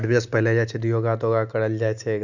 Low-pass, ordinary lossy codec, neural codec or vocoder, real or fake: 7.2 kHz; none; none; real